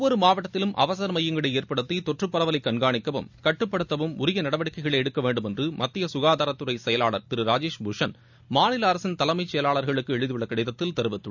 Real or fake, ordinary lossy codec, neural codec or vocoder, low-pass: real; none; none; 7.2 kHz